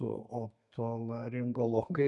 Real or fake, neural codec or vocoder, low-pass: fake; codec, 32 kHz, 1.9 kbps, SNAC; 10.8 kHz